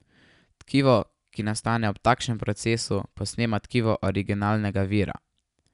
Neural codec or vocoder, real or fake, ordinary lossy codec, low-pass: none; real; none; 10.8 kHz